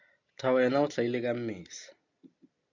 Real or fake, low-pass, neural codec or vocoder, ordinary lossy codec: real; 7.2 kHz; none; MP3, 64 kbps